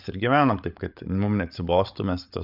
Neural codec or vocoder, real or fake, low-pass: codec, 16 kHz, 8 kbps, FreqCodec, larger model; fake; 5.4 kHz